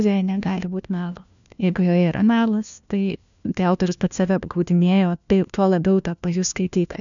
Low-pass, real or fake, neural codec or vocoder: 7.2 kHz; fake; codec, 16 kHz, 1 kbps, FunCodec, trained on LibriTTS, 50 frames a second